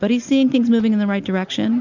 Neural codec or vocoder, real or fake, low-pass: none; real; 7.2 kHz